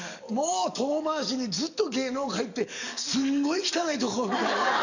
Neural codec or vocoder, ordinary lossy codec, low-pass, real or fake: codec, 44.1 kHz, 7.8 kbps, DAC; none; 7.2 kHz; fake